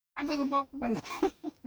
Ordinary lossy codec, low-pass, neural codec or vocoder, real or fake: none; none; codec, 44.1 kHz, 2.6 kbps, DAC; fake